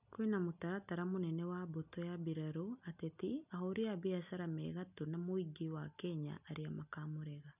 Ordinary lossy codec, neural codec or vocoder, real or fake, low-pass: none; none; real; 3.6 kHz